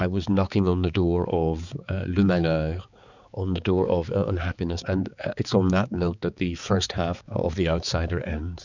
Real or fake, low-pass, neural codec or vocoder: fake; 7.2 kHz; codec, 16 kHz, 4 kbps, X-Codec, HuBERT features, trained on balanced general audio